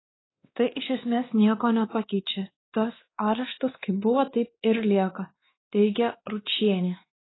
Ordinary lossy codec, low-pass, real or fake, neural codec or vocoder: AAC, 16 kbps; 7.2 kHz; fake; codec, 16 kHz, 2 kbps, X-Codec, WavLM features, trained on Multilingual LibriSpeech